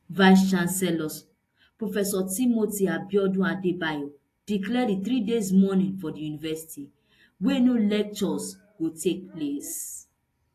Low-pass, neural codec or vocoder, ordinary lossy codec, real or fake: 14.4 kHz; none; AAC, 48 kbps; real